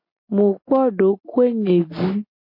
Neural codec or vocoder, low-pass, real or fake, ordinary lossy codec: none; 5.4 kHz; real; MP3, 32 kbps